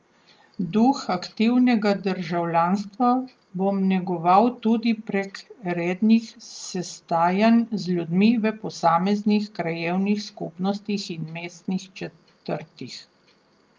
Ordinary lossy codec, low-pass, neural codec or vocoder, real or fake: Opus, 32 kbps; 7.2 kHz; none; real